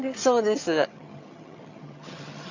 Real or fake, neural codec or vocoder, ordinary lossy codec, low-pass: fake; vocoder, 22.05 kHz, 80 mel bands, HiFi-GAN; AAC, 48 kbps; 7.2 kHz